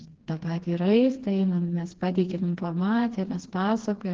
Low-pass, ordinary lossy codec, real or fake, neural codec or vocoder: 7.2 kHz; Opus, 16 kbps; fake; codec, 16 kHz, 2 kbps, FreqCodec, smaller model